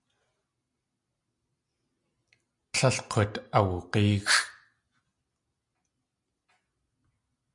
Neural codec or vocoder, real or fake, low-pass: none; real; 10.8 kHz